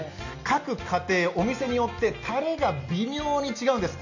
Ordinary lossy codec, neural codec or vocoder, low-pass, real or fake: none; none; 7.2 kHz; real